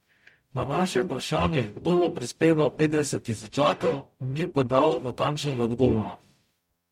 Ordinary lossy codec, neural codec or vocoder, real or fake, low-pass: MP3, 64 kbps; codec, 44.1 kHz, 0.9 kbps, DAC; fake; 19.8 kHz